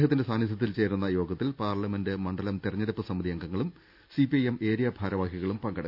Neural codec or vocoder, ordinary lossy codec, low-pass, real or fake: none; none; 5.4 kHz; real